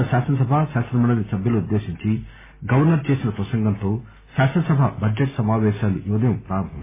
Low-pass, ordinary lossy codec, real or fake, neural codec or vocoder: 3.6 kHz; MP3, 16 kbps; real; none